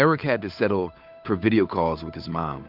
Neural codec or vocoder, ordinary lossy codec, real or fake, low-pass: codec, 16 kHz, 8 kbps, FunCodec, trained on Chinese and English, 25 frames a second; MP3, 48 kbps; fake; 5.4 kHz